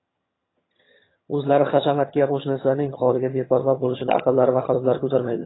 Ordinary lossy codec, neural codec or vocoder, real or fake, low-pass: AAC, 16 kbps; vocoder, 22.05 kHz, 80 mel bands, HiFi-GAN; fake; 7.2 kHz